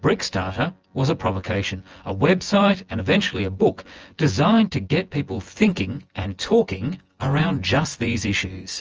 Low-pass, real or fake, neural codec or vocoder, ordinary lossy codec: 7.2 kHz; fake; vocoder, 24 kHz, 100 mel bands, Vocos; Opus, 24 kbps